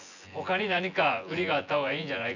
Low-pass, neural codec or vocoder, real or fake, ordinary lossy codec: 7.2 kHz; vocoder, 24 kHz, 100 mel bands, Vocos; fake; none